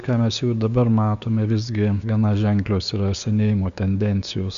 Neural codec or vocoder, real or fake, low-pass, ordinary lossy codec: codec, 16 kHz, 6 kbps, DAC; fake; 7.2 kHz; Opus, 64 kbps